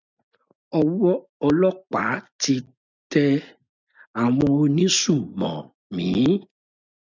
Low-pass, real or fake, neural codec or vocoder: 7.2 kHz; real; none